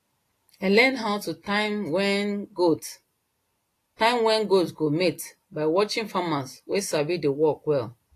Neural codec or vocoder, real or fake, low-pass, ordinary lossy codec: none; real; 14.4 kHz; AAC, 48 kbps